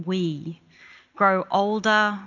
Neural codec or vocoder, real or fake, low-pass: none; real; 7.2 kHz